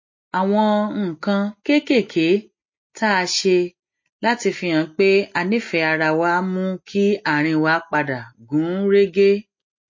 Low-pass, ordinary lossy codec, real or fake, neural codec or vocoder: 7.2 kHz; MP3, 32 kbps; real; none